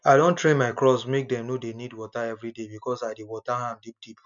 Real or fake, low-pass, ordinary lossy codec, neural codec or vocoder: real; 7.2 kHz; none; none